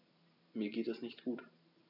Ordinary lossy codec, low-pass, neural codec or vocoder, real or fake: none; 5.4 kHz; none; real